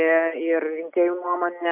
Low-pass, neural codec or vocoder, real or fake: 3.6 kHz; none; real